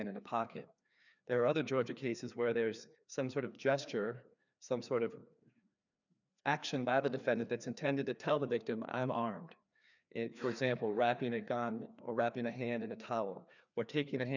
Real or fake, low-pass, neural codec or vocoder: fake; 7.2 kHz; codec, 16 kHz, 2 kbps, FreqCodec, larger model